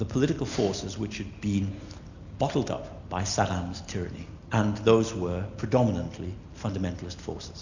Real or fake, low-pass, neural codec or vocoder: real; 7.2 kHz; none